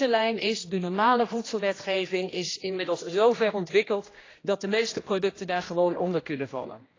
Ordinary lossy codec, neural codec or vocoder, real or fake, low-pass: AAC, 32 kbps; codec, 16 kHz, 1 kbps, X-Codec, HuBERT features, trained on general audio; fake; 7.2 kHz